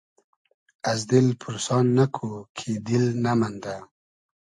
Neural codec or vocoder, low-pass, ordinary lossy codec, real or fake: none; 10.8 kHz; AAC, 64 kbps; real